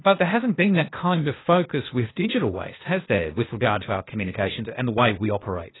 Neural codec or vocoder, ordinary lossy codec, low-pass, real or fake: codec, 16 kHz, 0.8 kbps, ZipCodec; AAC, 16 kbps; 7.2 kHz; fake